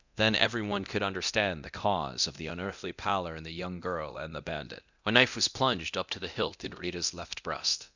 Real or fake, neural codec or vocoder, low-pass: fake; codec, 24 kHz, 0.9 kbps, DualCodec; 7.2 kHz